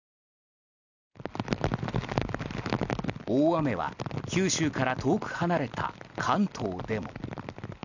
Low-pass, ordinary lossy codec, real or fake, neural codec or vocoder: 7.2 kHz; none; real; none